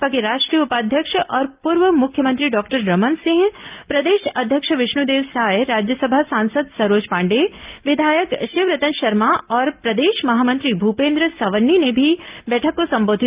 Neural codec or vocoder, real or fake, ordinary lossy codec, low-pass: none; real; Opus, 24 kbps; 3.6 kHz